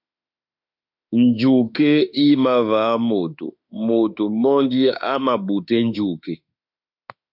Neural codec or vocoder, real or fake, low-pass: autoencoder, 48 kHz, 32 numbers a frame, DAC-VAE, trained on Japanese speech; fake; 5.4 kHz